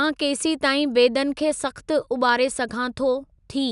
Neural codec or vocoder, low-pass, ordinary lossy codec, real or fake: none; 10.8 kHz; none; real